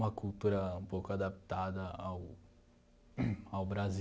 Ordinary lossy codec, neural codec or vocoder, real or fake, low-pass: none; none; real; none